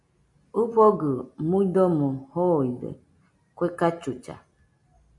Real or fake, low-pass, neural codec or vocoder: real; 10.8 kHz; none